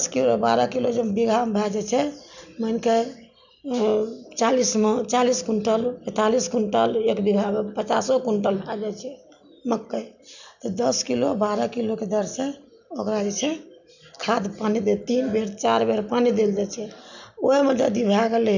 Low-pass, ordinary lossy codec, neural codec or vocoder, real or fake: 7.2 kHz; none; none; real